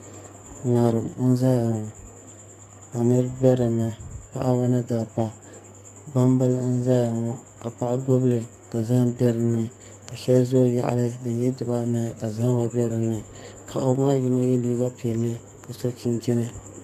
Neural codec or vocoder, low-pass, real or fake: codec, 44.1 kHz, 2.6 kbps, SNAC; 14.4 kHz; fake